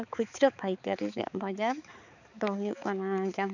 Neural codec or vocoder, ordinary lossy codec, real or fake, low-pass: codec, 16 kHz, 4 kbps, X-Codec, HuBERT features, trained on balanced general audio; none; fake; 7.2 kHz